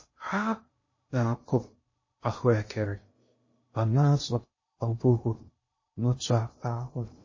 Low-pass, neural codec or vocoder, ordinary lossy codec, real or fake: 7.2 kHz; codec, 16 kHz in and 24 kHz out, 0.6 kbps, FocalCodec, streaming, 2048 codes; MP3, 32 kbps; fake